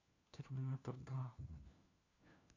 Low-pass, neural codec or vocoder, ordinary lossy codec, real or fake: 7.2 kHz; codec, 16 kHz, 1 kbps, FunCodec, trained on LibriTTS, 50 frames a second; AAC, 32 kbps; fake